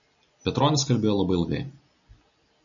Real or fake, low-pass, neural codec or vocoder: real; 7.2 kHz; none